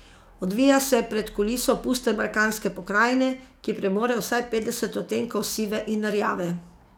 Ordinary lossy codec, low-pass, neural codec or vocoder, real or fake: none; none; codec, 44.1 kHz, 7.8 kbps, DAC; fake